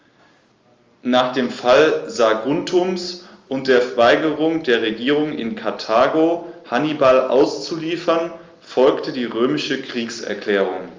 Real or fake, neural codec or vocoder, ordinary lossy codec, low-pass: real; none; Opus, 32 kbps; 7.2 kHz